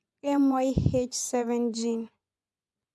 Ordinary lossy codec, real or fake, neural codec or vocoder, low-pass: none; fake; vocoder, 24 kHz, 100 mel bands, Vocos; none